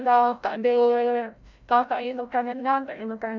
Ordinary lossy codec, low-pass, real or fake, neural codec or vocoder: MP3, 64 kbps; 7.2 kHz; fake; codec, 16 kHz, 0.5 kbps, FreqCodec, larger model